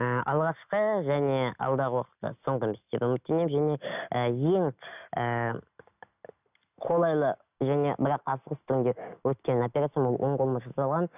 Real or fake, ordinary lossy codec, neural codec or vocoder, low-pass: real; none; none; 3.6 kHz